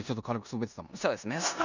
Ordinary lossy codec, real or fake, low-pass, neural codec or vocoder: none; fake; 7.2 kHz; codec, 16 kHz in and 24 kHz out, 0.9 kbps, LongCat-Audio-Codec, four codebook decoder